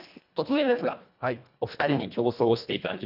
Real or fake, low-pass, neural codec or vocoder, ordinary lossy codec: fake; 5.4 kHz; codec, 24 kHz, 1.5 kbps, HILCodec; none